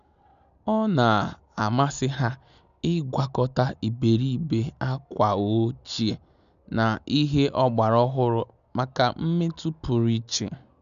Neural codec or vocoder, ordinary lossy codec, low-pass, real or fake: none; none; 7.2 kHz; real